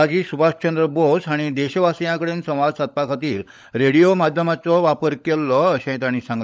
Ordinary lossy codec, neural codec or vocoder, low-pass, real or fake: none; codec, 16 kHz, 16 kbps, FunCodec, trained on LibriTTS, 50 frames a second; none; fake